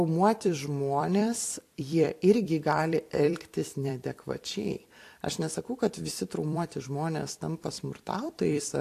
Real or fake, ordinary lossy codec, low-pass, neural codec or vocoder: fake; AAC, 64 kbps; 14.4 kHz; vocoder, 44.1 kHz, 128 mel bands every 256 samples, BigVGAN v2